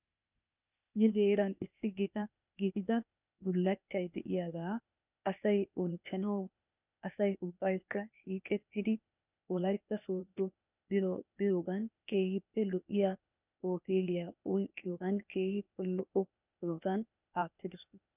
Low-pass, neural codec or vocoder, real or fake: 3.6 kHz; codec, 16 kHz, 0.8 kbps, ZipCodec; fake